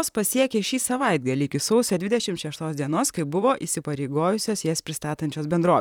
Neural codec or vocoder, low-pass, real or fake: vocoder, 44.1 kHz, 128 mel bands, Pupu-Vocoder; 19.8 kHz; fake